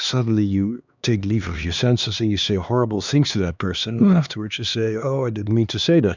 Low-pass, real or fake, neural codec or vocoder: 7.2 kHz; fake; codec, 16 kHz, 2 kbps, X-Codec, HuBERT features, trained on LibriSpeech